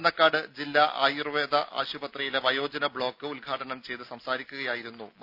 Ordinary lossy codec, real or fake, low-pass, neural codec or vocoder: none; real; 5.4 kHz; none